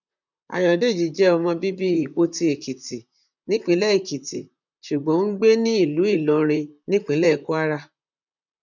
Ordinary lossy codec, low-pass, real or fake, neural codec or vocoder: none; 7.2 kHz; fake; vocoder, 44.1 kHz, 128 mel bands, Pupu-Vocoder